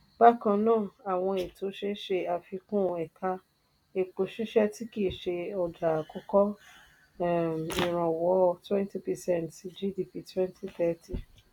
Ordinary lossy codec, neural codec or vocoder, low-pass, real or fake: none; none; 19.8 kHz; real